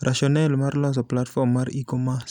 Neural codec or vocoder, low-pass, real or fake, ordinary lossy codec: none; 19.8 kHz; real; none